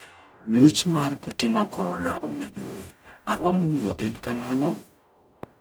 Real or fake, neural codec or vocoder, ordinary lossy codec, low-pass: fake; codec, 44.1 kHz, 0.9 kbps, DAC; none; none